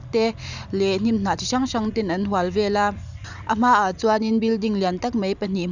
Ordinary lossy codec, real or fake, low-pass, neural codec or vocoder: none; fake; 7.2 kHz; vocoder, 44.1 kHz, 128 mel bands every 256 samples, BigVGAN v2